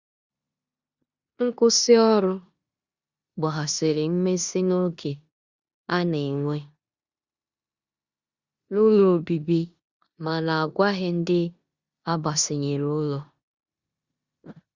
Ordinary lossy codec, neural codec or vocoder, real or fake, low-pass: Opus, 64 kbps; codec, 16 kHz in and 24 kHz out, 0.9 kbps, LongCat-Audio-Codec, four codebook decoder; fake; 7.2 kHz